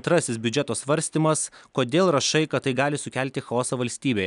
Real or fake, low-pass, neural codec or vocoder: real; 10.8 kHz; none